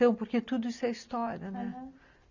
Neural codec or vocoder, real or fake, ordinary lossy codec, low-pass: none; real; none; 7.2 kHz